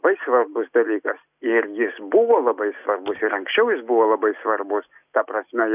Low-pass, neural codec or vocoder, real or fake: 3.6 kHz; none; real